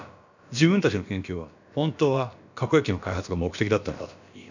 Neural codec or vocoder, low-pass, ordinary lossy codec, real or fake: codec, 16 kHz, about 1 kbps, DyCAST, with the encoder's durations; 7.2 kHz; none; fake